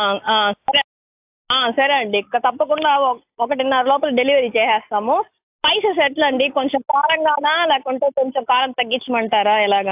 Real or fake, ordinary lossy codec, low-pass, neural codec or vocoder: real; AAC, 32 kbps; 3.6 kHz; none